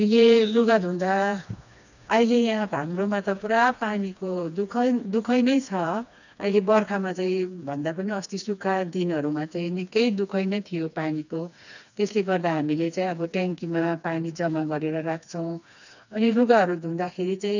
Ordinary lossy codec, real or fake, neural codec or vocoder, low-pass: none; fake; codec, 16 kHz, 2 kbps, FreqCodec, smaller model; 7.2 kHz